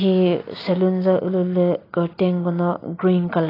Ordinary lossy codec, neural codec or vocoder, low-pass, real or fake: AAC, 24 kbps; none; 5.4 kHz; real